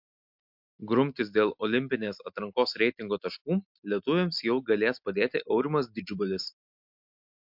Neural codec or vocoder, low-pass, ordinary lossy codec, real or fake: none; 5.4 kHz; MP3, 48 kbps; real